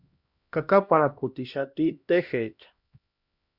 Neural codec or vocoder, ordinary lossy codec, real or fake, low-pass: codec, 16 kHz, 1 kbps, X-Codec, HuBERT features, trained on LibriSpeech; Opus, 64 kbps; fake; 5.4 kHz